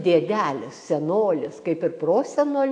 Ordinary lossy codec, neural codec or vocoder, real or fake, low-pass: AAC, 48 kbps; autoencoder, 48 kHz, 128 numbers a frame, DAC-VAE, trained on Japanese speech; fake; 9.9 kHz